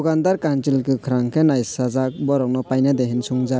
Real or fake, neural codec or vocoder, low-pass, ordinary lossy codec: real; none; none; none